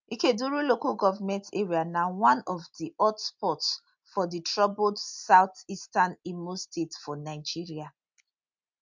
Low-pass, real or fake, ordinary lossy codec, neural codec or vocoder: 7.2 kHz; real; MP3, 64 kbps; none